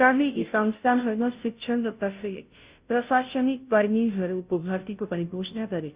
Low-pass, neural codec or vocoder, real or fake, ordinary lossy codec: 3.6 kHz; codec, 16 kHz, 0.5 kbps, FunCodec, trained on Chinese and English, 25 frames a second; fake; Opus, 64 kbps